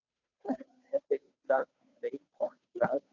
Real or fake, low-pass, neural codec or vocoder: fake; 7.2 kHz; codec, 16 kHz, 2 kbps, FunCodec, trained on Chinese and English, 25 frames a second